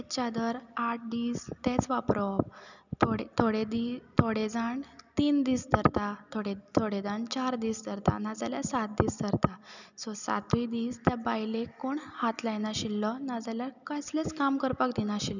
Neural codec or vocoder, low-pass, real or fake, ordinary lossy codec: none; 7.2 kHz; real; none